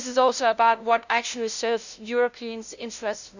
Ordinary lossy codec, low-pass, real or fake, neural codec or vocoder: none; 7.2 kHz; fake; codec, 16 kHz, 0.5 kbps, FunCodec, trained on LibriTTS, 25 frames a second